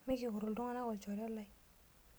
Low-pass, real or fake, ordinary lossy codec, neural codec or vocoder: none; real; none; none